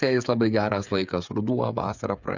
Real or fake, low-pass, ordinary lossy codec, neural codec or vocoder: fake; 7.2 kHz; Opus, 64 kbps; codec, 16 kHz, 8 kbps, FreqCodec, smaller model